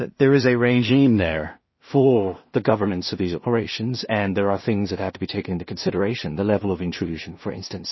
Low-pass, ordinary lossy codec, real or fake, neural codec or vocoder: 7.2 kHz; MP3, 24 kbps; fake; codec, 16 kHz in and 24 kHz out, 0.4 kbps, LongCat-Audio-Codec, two codebook decoder